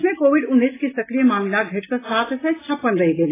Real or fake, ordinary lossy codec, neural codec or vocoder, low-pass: real; AAC, 16 kbps; none; 3.6 kHz